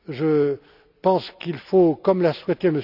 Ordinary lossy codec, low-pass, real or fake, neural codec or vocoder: none; 5.4 kHz; real; none